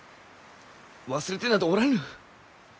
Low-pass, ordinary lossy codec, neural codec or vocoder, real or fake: none; none; none; real